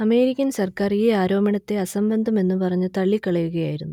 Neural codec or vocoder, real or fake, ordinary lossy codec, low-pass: none; real; none; 19.8 kHz